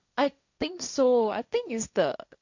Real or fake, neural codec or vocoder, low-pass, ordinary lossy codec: fake; codec, 16 kHz, 1.1 kbps, Voila-Tokenizer; 7.2 kHz; none